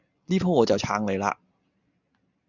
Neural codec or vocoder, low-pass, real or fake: none; 7.2 kHz; real